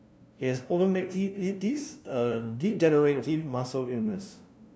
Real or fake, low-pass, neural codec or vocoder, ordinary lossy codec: fake; none; codec, 16 kHz, 0.5 kbps, FunCodec, trained on LibriTTS, 25 frames a second; none